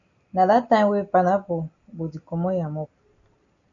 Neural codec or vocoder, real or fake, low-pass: none; real; 7.2 kHz